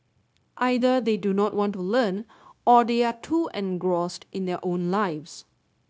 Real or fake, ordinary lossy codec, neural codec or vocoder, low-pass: fake; none; codec, 16 kHz, 0.9 kbps, LongCat-Audio-Codec; none